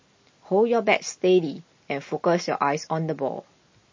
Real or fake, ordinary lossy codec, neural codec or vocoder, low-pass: real; MP3, 32 kbps; none; 7.2 kHz